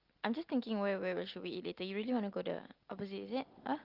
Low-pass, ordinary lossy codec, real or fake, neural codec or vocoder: 5.4 kHz; Opus, 32 kbps; real; none